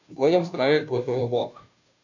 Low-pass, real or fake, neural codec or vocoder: 7.2 kHz; fake; codec, 16 kHz, 0.5 kbps, FunCodec, trained on Chinese and English, 25 frames a second